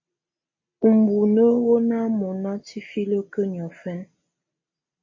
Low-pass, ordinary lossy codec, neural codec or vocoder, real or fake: 7.2 kHz; MP3, 32 kbps; none; real